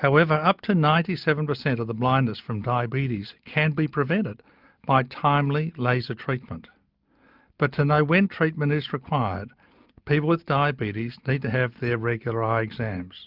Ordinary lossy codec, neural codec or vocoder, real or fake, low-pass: Opus, 24 kbps; none; real; 5.4 kHz